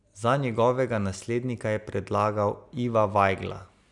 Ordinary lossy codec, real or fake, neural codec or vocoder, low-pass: none; real; none; 10.8 kHz